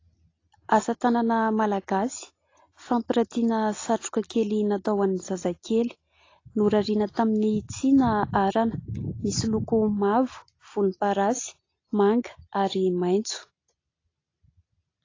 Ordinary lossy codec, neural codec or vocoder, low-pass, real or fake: AAC, 32 kbps; none; 7.2 kHz; real